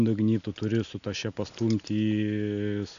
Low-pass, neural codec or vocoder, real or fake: 7.2 kHz; none; real